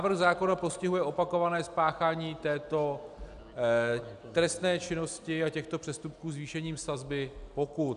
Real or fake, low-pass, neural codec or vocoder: real; 10.8 kHz; none